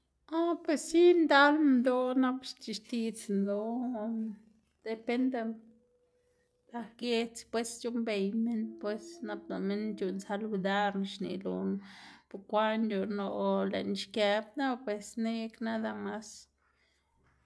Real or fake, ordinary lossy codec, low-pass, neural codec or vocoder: real; none; none; none